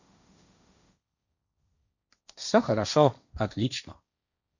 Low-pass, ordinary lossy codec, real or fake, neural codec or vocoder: 7.2 kHz; none; fake; codec, 16 kHz, 1.1 kbps, Voila-Tokenizer